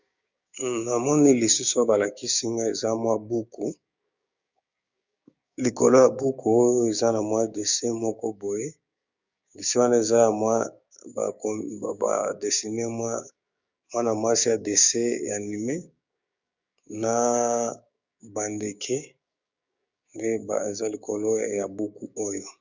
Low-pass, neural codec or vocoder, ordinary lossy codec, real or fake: 7.2 kHz; codec, 16 kHz, 6 kbps, DAC; Opus, 64 kbps; fake